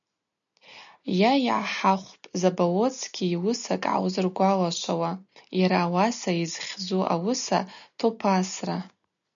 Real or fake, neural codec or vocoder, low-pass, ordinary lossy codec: real; none; 7.2 kHz; MP3, 96 kbps